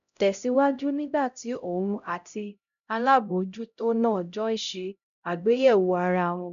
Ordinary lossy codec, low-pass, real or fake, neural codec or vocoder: none; 7.2 kHz; fake; codec, 16 kHz, 0.5 kbps, X-Codec, HuBERT features, trained on LibriSpeech